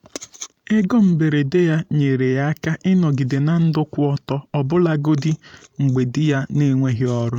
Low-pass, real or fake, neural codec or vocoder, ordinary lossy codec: 19.8 kHz; fake; vocoder, 44.1 kHz, 128 mel bands every 512 samples, BigVGAN v2; none